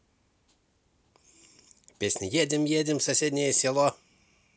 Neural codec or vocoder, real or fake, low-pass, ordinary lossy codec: none; real; none; none